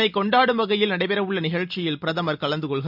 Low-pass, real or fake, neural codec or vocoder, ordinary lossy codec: 5.4 kHz; real; none; none